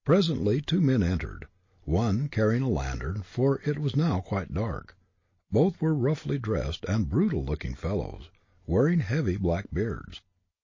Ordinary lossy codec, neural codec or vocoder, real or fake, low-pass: MP3, 32 kbps; none; real; 7.2 kHz